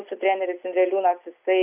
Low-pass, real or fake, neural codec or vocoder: 3.6 kHz; real; none